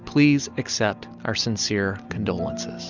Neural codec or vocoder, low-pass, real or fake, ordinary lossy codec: none; 7.2 kHz; real; Opus, 64 kbps